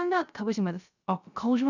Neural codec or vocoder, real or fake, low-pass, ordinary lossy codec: codec, 16 kHz, 0.3 kbps, FocalCodec; fake; 7.2 kHz; none